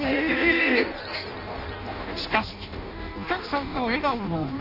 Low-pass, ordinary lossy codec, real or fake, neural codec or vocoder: 5.4 kHz; none; fake; codec, 16 kHz in and 24 kHz out, 0.6 kbps, FireRedTTS-2 codec